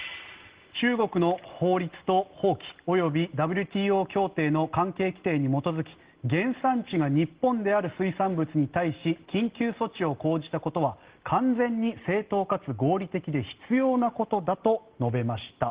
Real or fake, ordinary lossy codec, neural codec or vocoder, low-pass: real; Opus, 16 kbps; none; 3.6 kHz